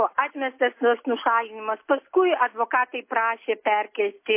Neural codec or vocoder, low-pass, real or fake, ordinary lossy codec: none; 3.6 kHz; real; MP3, 24 kbps